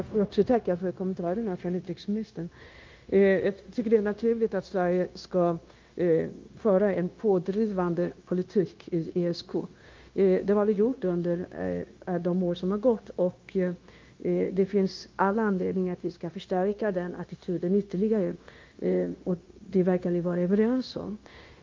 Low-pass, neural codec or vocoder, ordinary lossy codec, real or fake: 7.2 kHz; codec, 16 kHz, 0.9 kbps, LongCat-Audio-Codec; Opus, 32 kbps; fake